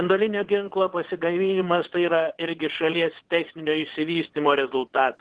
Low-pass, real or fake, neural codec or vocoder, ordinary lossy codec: 9.9 kHz; fake; vocoder, 22.05 kHz, 80 mel bands, WaveNeXt; Opus, 16 kbps